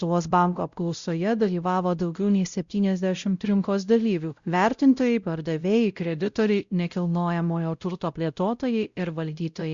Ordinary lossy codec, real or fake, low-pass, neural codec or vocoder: Opus, 64 kbps; fake; 7.2 kHz; codec, 16 kHz, 0.5 kbps, X-Codec, WavLM features, trained on Multilingual LibriSpeech